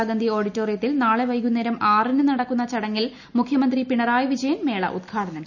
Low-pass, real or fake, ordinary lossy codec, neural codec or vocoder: 7.2 kHz; real; none; none